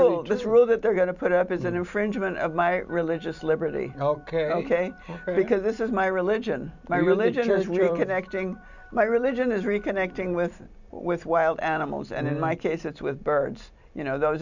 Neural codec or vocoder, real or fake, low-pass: vocoder, 44.1 kHz, 128 mel bands every 256 samples, BigVGAN v2; fake; 7.2 kHz